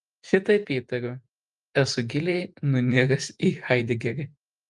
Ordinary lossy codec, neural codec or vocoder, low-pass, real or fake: Opus, 32 kbps; vocoder, 48 kHz, 128 mel bands, Vocos; 10.8 kHz; fake